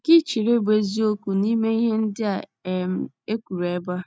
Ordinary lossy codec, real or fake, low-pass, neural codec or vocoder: none; real; none; none